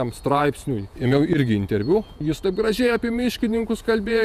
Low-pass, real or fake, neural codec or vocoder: 14.4 kHz; fake; vocoder, 48 kHz, 128 mel bands, Vocos